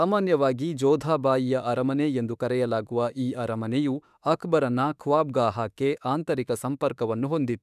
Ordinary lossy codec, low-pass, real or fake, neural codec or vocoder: none; 14.4 kHz; fake; autoencoder, 48 kHz, 32 numbers a frame, DAC-VAE, trained on Japanese speech